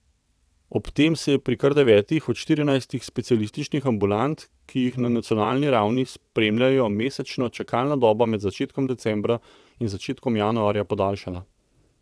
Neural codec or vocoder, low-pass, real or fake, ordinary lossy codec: vocoder, 22.05 kHz, 80 mel bands, WaveNeXt; none; fake; none